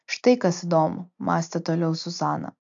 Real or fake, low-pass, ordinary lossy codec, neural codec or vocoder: real; 7.2 kHz; AAC, 64 kbps; none